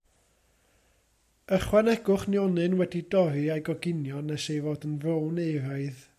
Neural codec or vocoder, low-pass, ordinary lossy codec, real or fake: none; 14.4 kHz; AAC, 96 kbps; real